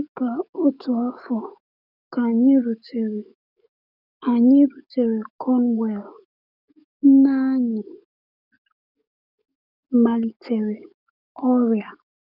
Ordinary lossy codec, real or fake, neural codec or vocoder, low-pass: none; fake; codec, 44.1 kHz, 7.8 kbps, DAC; 5.4 kHz